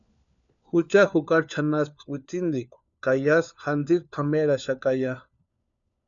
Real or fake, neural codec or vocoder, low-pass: fake; codec, 16 kHz, 4 kbps, FunCodec, trained on LibriTTS, 50 frames a second; 7.2 kHz